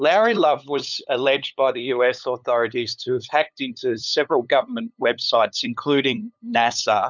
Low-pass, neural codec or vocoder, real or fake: 7.2 kHz; codec, 16 kHz, 8 kbps, FunCodec, trained on LibriTTS, 25 frames a second; fake